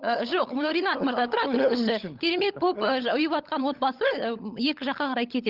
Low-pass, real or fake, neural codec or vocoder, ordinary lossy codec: 5.4 kHz; fake; codec, 16 kHz, 16 kbps, FunCodec, trained on LibriTTS, 50 frames a second; Opus, 32 kbps